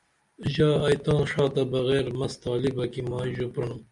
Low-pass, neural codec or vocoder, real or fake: 10.8 kHz; none; real